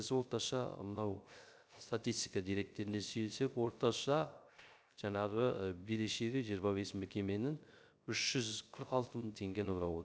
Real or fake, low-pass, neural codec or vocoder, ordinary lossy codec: fake; none; codec, 16 kHz, 0.3 kbps, FocalCodec; none